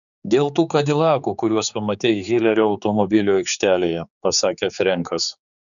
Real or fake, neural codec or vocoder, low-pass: fake; codec, 16 kHz, 4 kbps, X-Codec, HuBERT features, trained on general audio; 7.2 kHz